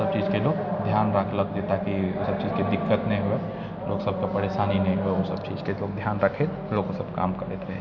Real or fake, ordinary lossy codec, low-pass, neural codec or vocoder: real; none; 7.2 kHz; none